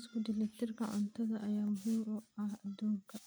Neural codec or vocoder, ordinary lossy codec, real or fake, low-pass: none; none; real; none